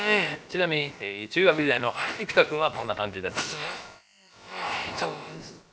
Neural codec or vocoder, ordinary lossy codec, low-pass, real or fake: codec, 16 kHz, about 1 kbps, DyCAST, with the encoder's durations; none; none; fake